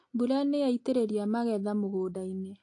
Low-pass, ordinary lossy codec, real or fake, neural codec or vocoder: 10.8 kHz; AAC, 48 kbps; real; none